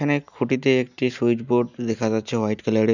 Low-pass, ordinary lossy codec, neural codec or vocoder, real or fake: 7.2 kHz; none; none; real